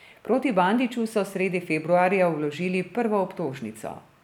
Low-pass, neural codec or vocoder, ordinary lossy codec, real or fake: 19.8 kHz; none; none; real